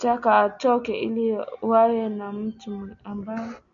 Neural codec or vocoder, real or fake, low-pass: none; real; 7.2 kHz